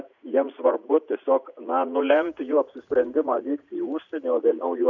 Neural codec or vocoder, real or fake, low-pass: vocoder, 44.1 kHz, 80 mel bands, Vocos; fake; 7.2 kHz